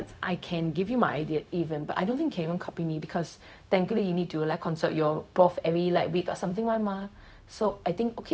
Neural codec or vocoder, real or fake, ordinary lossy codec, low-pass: codec, 16 kHz, 0.4 kbps, LongCat-Audio-Codec; fake; none; none